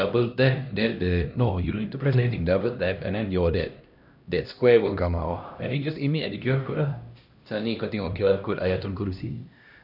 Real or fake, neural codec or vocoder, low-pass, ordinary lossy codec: fake; codec, 16 kHz, 1 kbps, X-Codec, HuBERT features, trained on LibriSpeech; 5.4 kHz; none